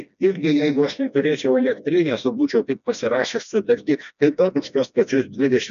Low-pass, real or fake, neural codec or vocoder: 7.2 kHz; fake; codec, 16 kHz, 1 kbps, FreqCodec, smaller model